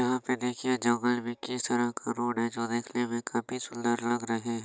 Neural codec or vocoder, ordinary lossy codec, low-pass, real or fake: none; none; none; real